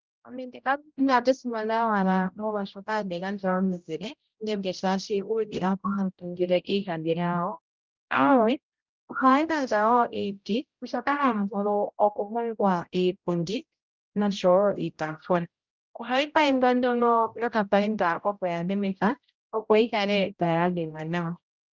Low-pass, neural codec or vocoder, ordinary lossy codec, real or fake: 7.2 kHz; codec, 16 kHz, 0.5 kbps, X-Codec, HuBERT features, trained on general audio; Opus, 24 kbps; fake